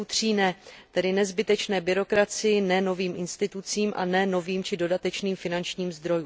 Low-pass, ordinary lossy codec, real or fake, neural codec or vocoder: none; none; real; none